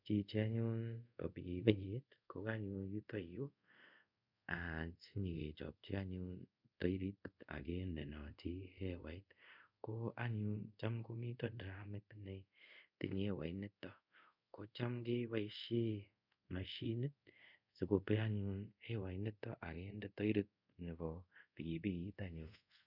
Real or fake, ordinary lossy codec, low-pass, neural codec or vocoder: fake; none; 5.4 kHz; codec, 24 kHz, 0.5 kbps, DualCodec